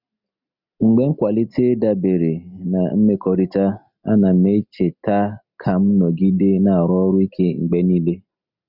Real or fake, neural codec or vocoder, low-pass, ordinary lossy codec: real; none; 5.4 kHz; none